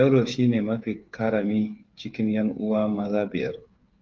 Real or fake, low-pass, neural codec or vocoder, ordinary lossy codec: fake; 7.2 kHz; codec, 16 kHz, 8 kbps, FreqCodec, smaller model; Opus, 32 kbps